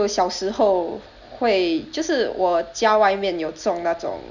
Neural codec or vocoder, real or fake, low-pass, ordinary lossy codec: none; real; 7.2 kHz; none